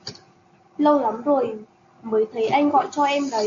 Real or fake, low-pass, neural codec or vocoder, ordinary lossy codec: real; 7.2 kHz; none; AAC, 48 kbps